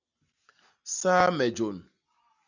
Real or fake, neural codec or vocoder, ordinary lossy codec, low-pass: real; none; Opus, 64 kbps; 7.2 kHz